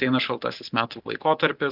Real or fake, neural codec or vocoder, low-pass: real; none; 5.4 kHz